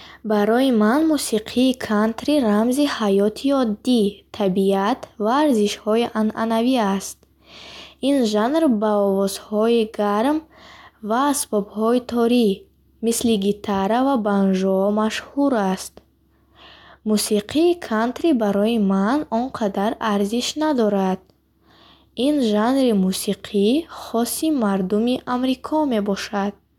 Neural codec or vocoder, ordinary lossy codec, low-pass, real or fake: none; none; 19.8 kHz; real